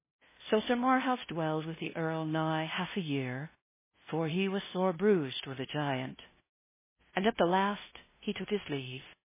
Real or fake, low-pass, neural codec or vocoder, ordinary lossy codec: fake; 3.6 kHz; codec, 16 kHz, 0.5 kbps, FunCodec, trained on LibriTTS, 25 frames a second; MP3, 16 kbps